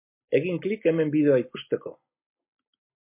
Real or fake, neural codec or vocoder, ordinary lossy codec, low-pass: real; none; MP3, 24 kbps; 3.6 kHz